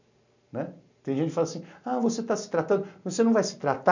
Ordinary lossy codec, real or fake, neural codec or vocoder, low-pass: none; real; none; 7.2 kHz